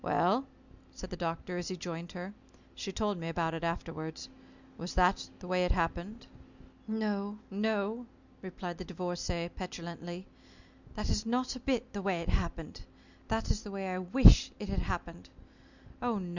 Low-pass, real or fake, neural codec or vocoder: 7.2 kHz; real; none